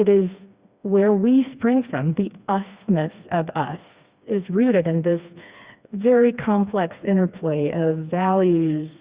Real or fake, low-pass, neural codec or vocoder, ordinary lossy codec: fake; 3.6 kHz; codec, 16 kHz, 2 kbps, FreqCodec, smaller model; Opus, 64 kbps